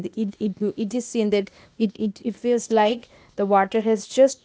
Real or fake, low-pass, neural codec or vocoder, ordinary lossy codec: fake; none; codec, 16 kHz, 0.8 kbps, ZipCodec; none